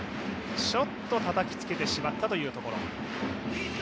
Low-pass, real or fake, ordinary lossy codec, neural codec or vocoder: none; real; none; none